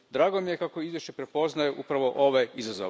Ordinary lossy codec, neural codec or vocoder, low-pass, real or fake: none; none; none; real